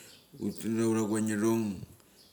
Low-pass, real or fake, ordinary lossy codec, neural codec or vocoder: none; real; none; none